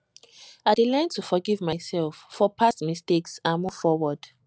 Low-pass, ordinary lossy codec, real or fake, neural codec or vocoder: none; none; real; none